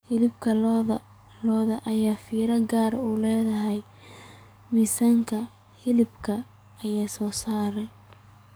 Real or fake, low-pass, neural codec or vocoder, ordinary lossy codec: fake; none; codec, 44.1 kHz, 7.8 kbps, DAC; none